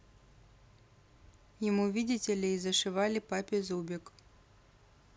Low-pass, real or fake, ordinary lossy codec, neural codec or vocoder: none; real; none; none